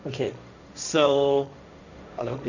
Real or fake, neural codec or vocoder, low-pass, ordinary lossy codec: fake; codec, 16 kHz, 1.1 kbps, Voila-Tokenizer; 7.2 kHz; none